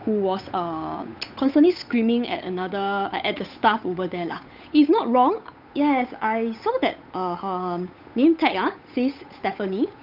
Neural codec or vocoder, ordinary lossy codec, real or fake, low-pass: codec, 16 kHz, 8 kbps, FunCodec, trained on Chinese and English, 25 frames a second; none; fake; 5.4 kHz